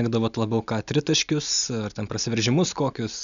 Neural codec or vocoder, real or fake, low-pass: none; real; 7.2 kHz